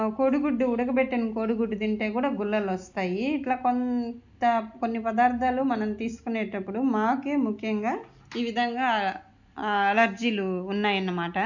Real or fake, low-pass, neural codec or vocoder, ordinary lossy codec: real; 7.2 kHz; none; none